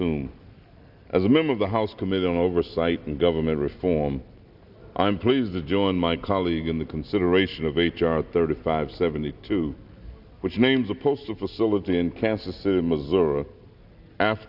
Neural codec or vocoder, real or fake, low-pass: none; real; 5.4 kHz